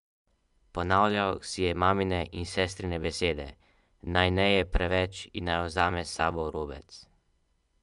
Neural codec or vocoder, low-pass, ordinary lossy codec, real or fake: vocoder, 24 kHz, 100 mel bands, Vocos; 10.8 kHz; none; fake